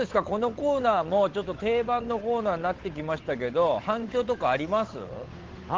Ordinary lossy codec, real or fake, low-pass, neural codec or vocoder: Opus, 16 kbps; fake; 7.2 kHz; codec, 16 kHz, 8 kbps, FunCodec, trained on Chinese and English, 25 frames a second